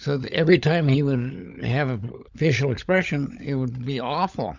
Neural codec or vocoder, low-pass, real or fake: codec, 16 kHz, 16 kbps, FunCodec, trained on LibriTTS, 50 frames a second; 7.2 kHz; fake